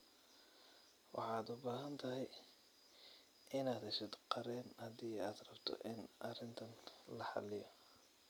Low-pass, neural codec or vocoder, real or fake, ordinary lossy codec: none; none; real; none